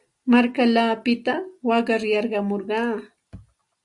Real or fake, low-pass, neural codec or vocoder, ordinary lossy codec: real; 10.8 kHz; none; Opus, 64 kbps